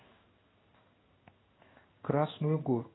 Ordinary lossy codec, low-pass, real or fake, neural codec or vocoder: AAC, 16 kbps; 7.2 kHz; fake; codec, 16 kHz in and 24 kHz out, 1 kbps, XY-Tokenizer